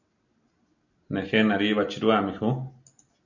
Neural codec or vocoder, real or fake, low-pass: none; real; 7.2 kHz